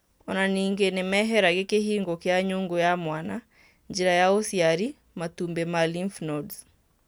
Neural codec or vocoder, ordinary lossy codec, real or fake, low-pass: none; none; real; none